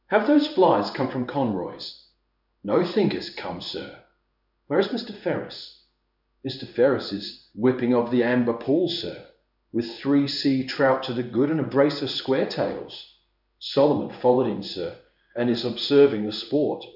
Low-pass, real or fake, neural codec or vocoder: 5.4 kHz; fake; codec, 16 kHz in and 24 kHz out, 1 kbps, XY-Tokenizer